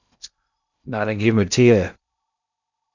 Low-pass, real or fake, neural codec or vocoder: 7.2 kHz; fake; codec, 16 kHz in and 24 kHz out, 0.6 kbps, FocalCodec, streaming, 2048 codes